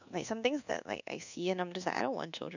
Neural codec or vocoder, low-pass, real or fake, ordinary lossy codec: codec, 24 kHz, 1.2 kbps, DualCodec; 7.2 kHz; fake; none